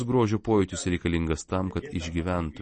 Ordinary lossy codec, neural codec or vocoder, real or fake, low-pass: MP3, 32 kbps; none; real; 10.8 kHz